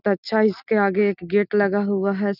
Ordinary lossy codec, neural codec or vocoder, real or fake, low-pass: none; none; real; 5.4 kHz